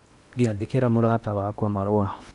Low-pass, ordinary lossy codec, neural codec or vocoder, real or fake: 10.8 kHz; none; codec, 16 kHz in and 24 kHz out, 0.8 kbps, FocalCodec, streaming, 65536 codes; fake